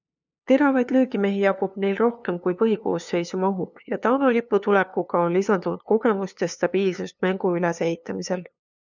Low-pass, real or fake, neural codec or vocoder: 7.2 kHz; fake; codec, 16 kHz, 2 kbps, FunCodec, trained on LibriTTS, 25 frames a second